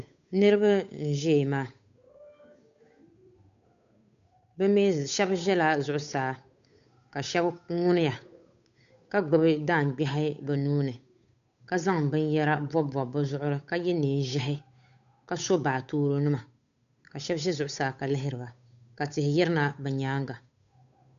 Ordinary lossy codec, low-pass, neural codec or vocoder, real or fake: AAC, 96 kbps; 7.2 kHz; codec, 16 kHz, 8 kbps, FunCodec, trained on Chinese and English, 25 frames a second; fake